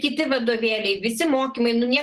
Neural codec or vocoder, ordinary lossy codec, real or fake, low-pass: none; Opus, 16 kbps; real; 10.8 kHz